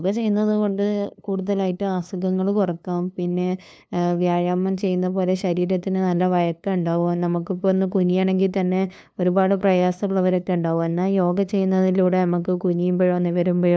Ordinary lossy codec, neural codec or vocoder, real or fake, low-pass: none; codec, 16 kHz, 2 kbps, FunCodec, trained on LibriTTS, 25 frames a second; fake; none